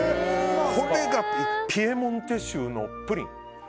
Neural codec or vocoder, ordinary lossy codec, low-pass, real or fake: none; none; none; real